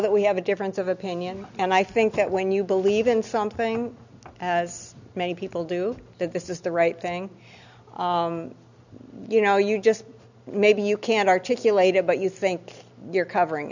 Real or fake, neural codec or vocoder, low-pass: real; none; 7.2 kHz